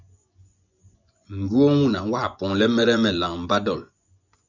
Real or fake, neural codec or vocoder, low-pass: fake; vocoder, 24 kHz, 100 mel bands, Vocos; 7.2 kHz